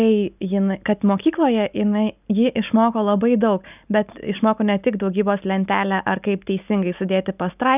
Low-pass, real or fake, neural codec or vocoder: 3.6 kHz; real; none